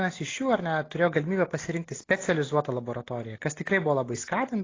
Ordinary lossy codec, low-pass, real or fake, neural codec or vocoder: AAC, 32 kbps; 7.2 kHz; real; none